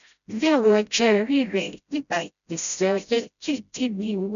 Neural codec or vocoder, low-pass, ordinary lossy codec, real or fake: codec, 16 kHz, 0.5 kbps, FreqCodec, smaller model; 7.2 kHz; none; fake